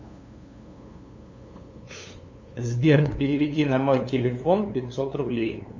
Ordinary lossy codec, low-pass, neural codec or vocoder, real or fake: MP3, 64 kbps; 7.2 kHz; codec, 16 kHz, 2 kbps, FunCodec, trained on LibriTTS, 25 frames a second; fake